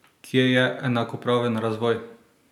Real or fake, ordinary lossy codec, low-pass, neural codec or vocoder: real; none; 19.8 kHz; none